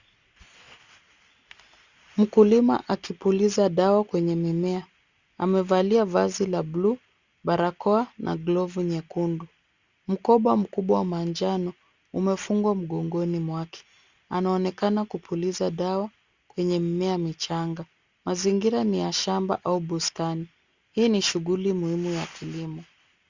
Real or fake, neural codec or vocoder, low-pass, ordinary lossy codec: real; none; 7.2 kHz; Opus, 64 kbps